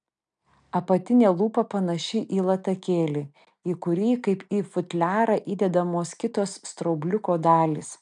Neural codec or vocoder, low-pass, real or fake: none; 9.9 kHz; real